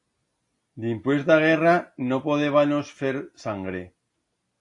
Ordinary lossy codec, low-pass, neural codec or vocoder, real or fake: AAC, 48 kbps; 10.8 kHz; none; real